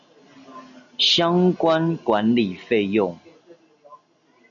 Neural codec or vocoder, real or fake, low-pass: none; real; 7.2 kHz